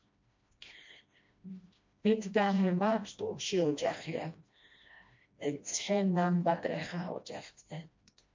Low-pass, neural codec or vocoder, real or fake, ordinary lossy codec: 7.2 kHz; codec, 16 kHz, 1 kbps, FreqCodec, smaller model; fake; MP3, 48 kbps